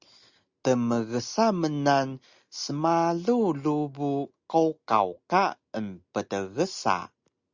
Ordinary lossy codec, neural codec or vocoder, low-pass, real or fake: Opus, 64 kbps; none; 7.2 kHz; real